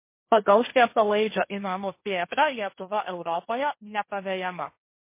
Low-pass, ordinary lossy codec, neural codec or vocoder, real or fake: 3.6 kHz; MP3, 24 kbps; codec, 16 kHz, 1.1 kbps, Voila-Tokenizer; fake